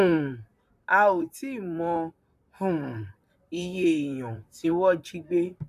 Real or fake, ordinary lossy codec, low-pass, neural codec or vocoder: fake; none; 14.4 kHz; vocoder, 44.1 kHz, 128 mel bands, Pupu-Vocoder